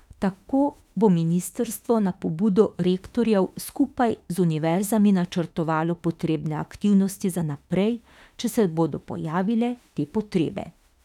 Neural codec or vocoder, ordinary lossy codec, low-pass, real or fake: autoencoder, 48 kHz, 32 numbers a frame, DAC-VAE, trained on Japanese speech; none; 19.8 kHz; fake